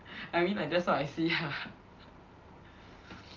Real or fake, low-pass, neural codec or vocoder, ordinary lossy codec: real; 7.2 kHz; none; Opus, 24 kbps